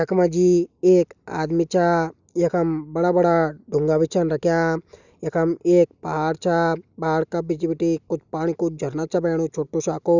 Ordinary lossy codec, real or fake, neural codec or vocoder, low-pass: none; real; none; 7.2 kHz